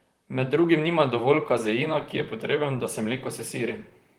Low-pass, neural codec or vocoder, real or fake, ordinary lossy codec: 19.8 kHz; vocoder, 44.1 kHz, 128 mel bands, Pupu-Vocoder; fake; Opus, 24 kbps